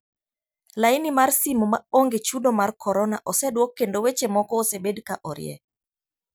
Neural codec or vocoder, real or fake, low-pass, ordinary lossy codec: none; real; none; none